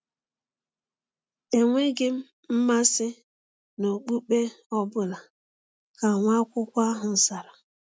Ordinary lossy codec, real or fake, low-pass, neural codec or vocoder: none; real; none; none